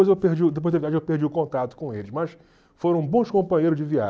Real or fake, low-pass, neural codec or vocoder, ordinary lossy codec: real; none; none; none